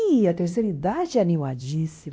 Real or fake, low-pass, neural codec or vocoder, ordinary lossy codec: fake; none; codec, 16 kHz, 1 kbps, X-Codec, WavLM features, trained on Multilingual LibriSpeech; none